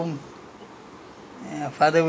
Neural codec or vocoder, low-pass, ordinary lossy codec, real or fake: none; none; none; real